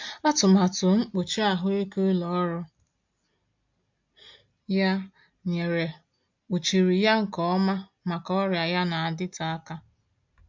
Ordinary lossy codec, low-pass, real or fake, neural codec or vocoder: MP3, 48 kbps; 7.2 kHz; real; none